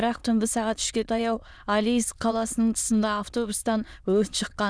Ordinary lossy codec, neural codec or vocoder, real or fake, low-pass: none; autoencoder, 22.05 kHz, a latent of 192 numbers a frame, VITS, trained on many speakers; fake; none